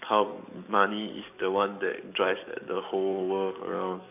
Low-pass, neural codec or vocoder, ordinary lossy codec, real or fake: 3.6 kHz; codec, 44.1 kHz, 7.8 kbps, DAC; none; fake